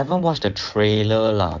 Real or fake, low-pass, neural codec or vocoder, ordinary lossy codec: fake; 7.2 kHz; codec, 16 kHz in and 24 kHz out, 2.2 kbps, FireRedTTS-2 codec; none